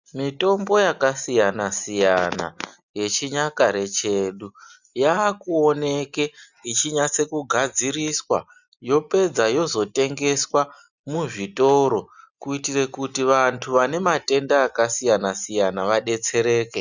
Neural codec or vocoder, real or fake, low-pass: none; real; 7.2 kHz